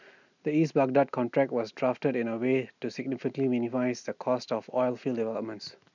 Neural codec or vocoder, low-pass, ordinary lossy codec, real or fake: none; 7.2 kHz; none; real